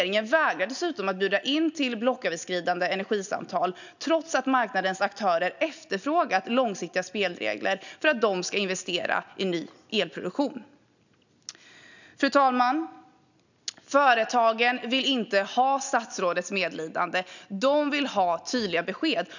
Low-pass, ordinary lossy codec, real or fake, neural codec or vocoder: 7.2 kHz; none; real; none